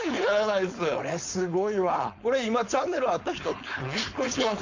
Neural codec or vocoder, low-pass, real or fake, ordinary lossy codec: codec, 16 kHz, 4.8 kbps, FACodec; 7.2 kHz; fake; MP3, 48 kbps